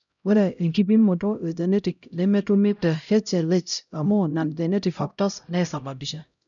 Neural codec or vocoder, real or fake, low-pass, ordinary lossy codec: codec, 16 kHz, 0.5 kbps, X-Codec, HuBERT features, trained on LibriSpeech; fake; 7.2 kHz; none